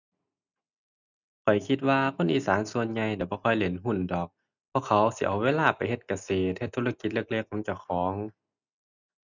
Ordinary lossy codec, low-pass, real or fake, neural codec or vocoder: none; 7.2 kHz; real; none